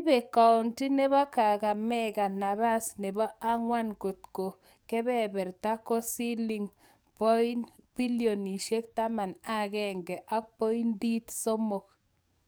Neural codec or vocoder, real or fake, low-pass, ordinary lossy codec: codec, 44.1 kHz, 7.8 kbps, DAC; fake; none; none